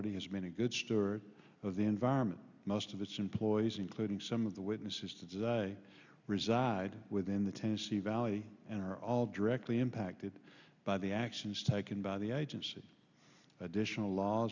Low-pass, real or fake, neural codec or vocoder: 7.2 kHz; real; none